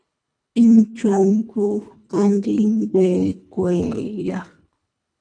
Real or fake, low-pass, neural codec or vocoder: fake; 9.9 kHz; codec, 24 kHz, 1.5 kbps, HILCodec